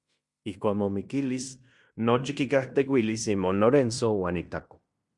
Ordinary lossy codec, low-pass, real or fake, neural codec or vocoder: Opus, 64 kbps; 10.8 kHz; fake; codec, 16 kHz in and 24 kHz out, 0.9 kbps, LongCat-Audio-Codec, fine tuned four codebook decoder